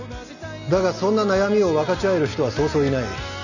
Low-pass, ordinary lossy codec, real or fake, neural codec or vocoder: 7.2 kHz; none; real; none